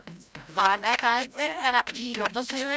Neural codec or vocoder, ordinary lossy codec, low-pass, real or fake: codec, 16 kHz, 0.5 kbps, FreqCodec, larger model; none; none; fake